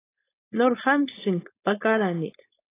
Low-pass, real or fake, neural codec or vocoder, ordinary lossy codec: 3.6 kHz; fake; codec, 16 kHz, 4.8 kbps, FACodec; AAC, 24 kbps